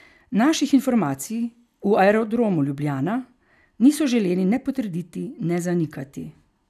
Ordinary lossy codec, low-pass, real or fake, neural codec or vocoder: none; 14.4 kHz; real; none